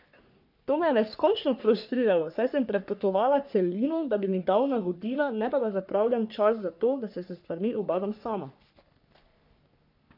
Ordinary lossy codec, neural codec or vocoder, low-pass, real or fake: none; codec, 44.1 kHz, 3.4 kbps, Pupu-Codec; 5.4 kHz; fake